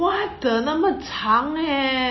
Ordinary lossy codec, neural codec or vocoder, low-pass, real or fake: MP3, 24 kbps; none; 7.2 kHz; real